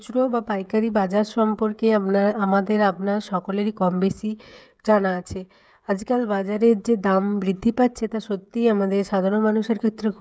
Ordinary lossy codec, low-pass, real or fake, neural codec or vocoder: none; none; fake; codec, 16 kHz, 16 kbps, FreqCodec, smaller model